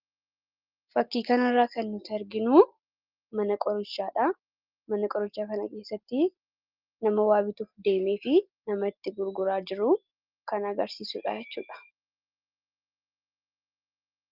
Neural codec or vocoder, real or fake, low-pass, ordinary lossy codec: none; real; 5.4 kHz; Opus, 32 kbps